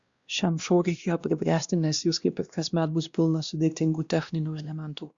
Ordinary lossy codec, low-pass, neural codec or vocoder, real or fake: Opus, 64 kbps; 7.2 kHz; codec, 16 kHz, 1 kbps, X-Codec, WavLM features, trained on Multilingual LibriSpeech; fake